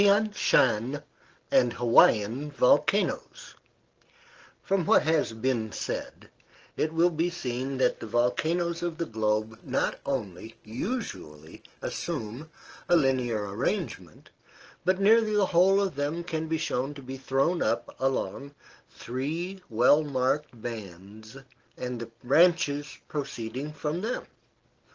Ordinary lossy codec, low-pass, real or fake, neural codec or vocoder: Opus, 16 kbps; 7.2 kHz; real; none